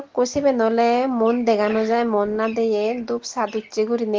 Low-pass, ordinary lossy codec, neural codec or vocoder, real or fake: 7.2 kHz; Opus, 16 kbps; none; real